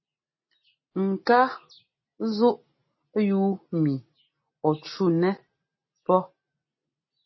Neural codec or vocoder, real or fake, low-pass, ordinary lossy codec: none; real; 7.2 kHz; MP3, 24 kbps